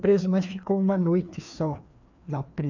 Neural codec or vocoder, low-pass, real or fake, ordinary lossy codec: codec, 16 kHz, 2 kbps, FreqCodec, larger model; 7.2 kHz; fake; none